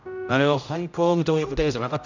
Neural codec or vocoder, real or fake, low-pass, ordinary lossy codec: codec, 16 kHz, 0.5 kbps, X-Codec, HuBERT features, trained on general audio; fake; 7.2 kHz; none